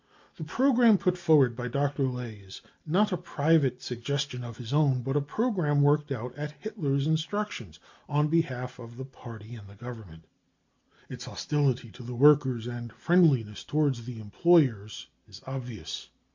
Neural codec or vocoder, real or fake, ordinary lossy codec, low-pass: none; real; MP3, 48 kbps; 7.2 kHz